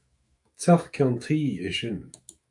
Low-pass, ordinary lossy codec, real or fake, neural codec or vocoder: 10.8 kHz; AAC, 64 kbps; fake; autoencoder, 48 kHz, 128 numbers a frame, DAC-VAE, trained on Japanese speech